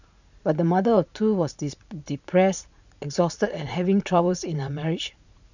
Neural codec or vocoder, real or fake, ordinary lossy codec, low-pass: vocoder, 44.1 kHz, 80 mel bands, Vocos; fake; none; 7.2 kHz